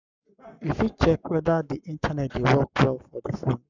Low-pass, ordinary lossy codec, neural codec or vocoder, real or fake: 7.2 kHz; none; codec, 44.1 kHz, 7.8 kbps, Pupu-Codec; fake